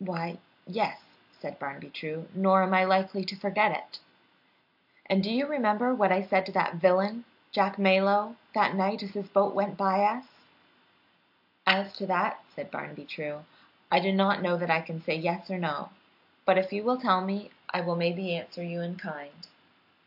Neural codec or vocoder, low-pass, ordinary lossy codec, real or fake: none; 5.4 kHz; AAC, 48 kbps; real